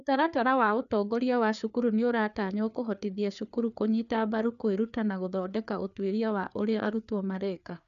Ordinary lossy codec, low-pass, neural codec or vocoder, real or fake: none; 7.2 kHz; codec, 16 kHz, 4 kbps, FreqCodec, larger model; fake